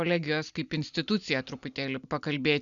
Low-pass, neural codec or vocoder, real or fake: 7.2 kHz; none; real